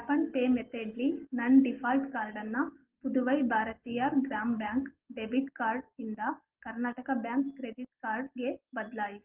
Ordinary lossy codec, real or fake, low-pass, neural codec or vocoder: Opus, 16 kbps; real; 3.6 kHz; none